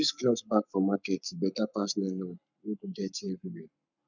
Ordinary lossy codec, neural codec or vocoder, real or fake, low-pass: none; codec, 44.1 kHz, 7.8 kbps, Pupu-Codec; fake; 7.2 kHz